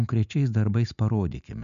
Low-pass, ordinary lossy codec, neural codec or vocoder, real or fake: 7.2 kHz; MP3, 96 kbps; none; real